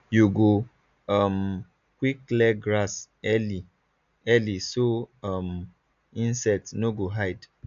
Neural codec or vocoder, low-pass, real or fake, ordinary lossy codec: none; 7.2 kHz; real; none